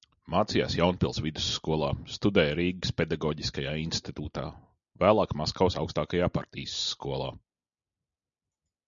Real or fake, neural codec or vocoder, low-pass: real; none; 7.2 kHz